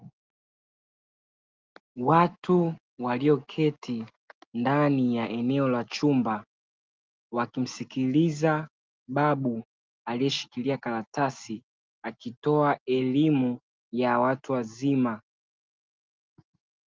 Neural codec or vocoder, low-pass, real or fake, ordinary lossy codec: none; 7.2 kHz; real; Opus, 32 kbps